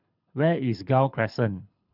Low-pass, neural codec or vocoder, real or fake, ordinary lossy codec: 5.4 kHz; codec, 24 kHz, 6 kbps, HILCodec; fake; none